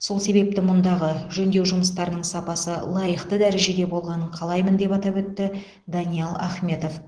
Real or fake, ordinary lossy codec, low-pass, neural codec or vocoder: real; Opus, 16 kbps; 9.9 kHz; none